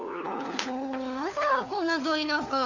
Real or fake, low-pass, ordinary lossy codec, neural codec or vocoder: fake; 7.2 kHz; none; codec, 16 kHz, 4 kbps, FunCodec, trained on LibriTTS, 50 frames a second